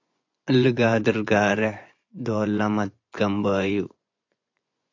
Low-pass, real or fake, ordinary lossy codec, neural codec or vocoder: 7.2 kHz; fake; AAC, 48 kbps; vocoder, 24 kHz, 100 mel bands, Vocos